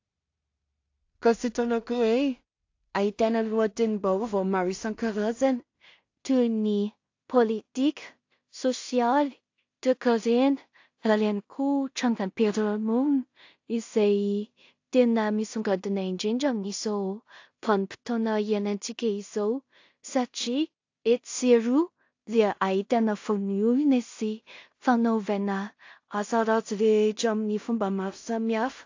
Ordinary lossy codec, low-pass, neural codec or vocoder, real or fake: AAC, 48 kbps; 7.2 kHz; codec, 16 kHz in and 24 kHz out, 0.4 kbps, LongCat-Audio-Codec, two codebook decoder; fake